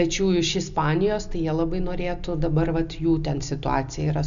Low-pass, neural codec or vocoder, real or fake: 7.2 kHz; none; real